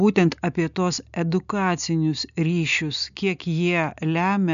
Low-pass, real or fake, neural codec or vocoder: 7.2 kHz; real; none